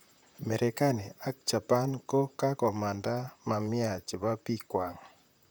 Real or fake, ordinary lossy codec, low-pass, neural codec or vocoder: fake; none; none; vocoder, 44.1 kHz, 128 mel bands, Pupu-Vocoder